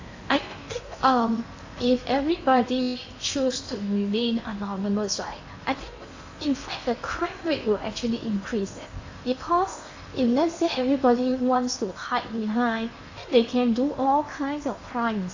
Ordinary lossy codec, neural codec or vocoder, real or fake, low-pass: AAC, 48 kbps; codec, 16 kHz in and 24 kHz out, 0.8 kbps, FocalCodec, streaming, 65536 codes; fake; 7.2 kHz